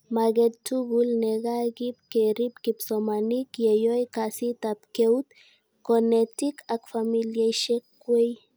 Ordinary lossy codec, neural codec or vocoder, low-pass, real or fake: none; none; none; real